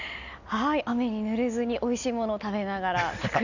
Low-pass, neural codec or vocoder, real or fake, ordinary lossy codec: 7.2 kHz; none; real; none